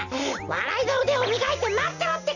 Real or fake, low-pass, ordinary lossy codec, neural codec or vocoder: real; 7.2 kHz; none; none